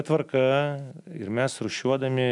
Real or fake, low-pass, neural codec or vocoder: real; 10.8 kHz; none